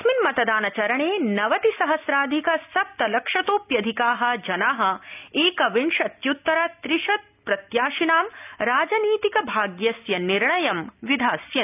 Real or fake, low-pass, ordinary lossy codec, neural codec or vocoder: real; 3.6 kHz; none; none